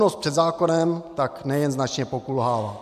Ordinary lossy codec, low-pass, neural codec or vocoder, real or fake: MP3, 96 kbps; 14.4 kHz; none; real